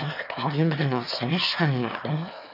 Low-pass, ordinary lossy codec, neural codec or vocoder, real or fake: 5.4 kHz; none; autoencoder, 22.05 kHz, a latent of 192 numbers a frame, VITS, trained on one speaker; fake